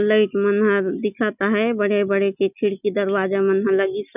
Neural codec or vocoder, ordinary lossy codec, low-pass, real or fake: none; none; 3.6 kHz; real